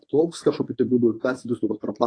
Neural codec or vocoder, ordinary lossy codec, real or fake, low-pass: codec, 24 kHz, 0.9 kbps, WavTokenizer, medium speech release version 1; AAC, 32 kbps; fake; 9.9 kHz